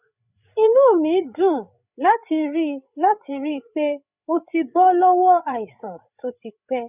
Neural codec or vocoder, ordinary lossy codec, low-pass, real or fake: codec, 16 kHz, 8 kbps, FreqCodec, larger model; none; 3.6 kHz; fake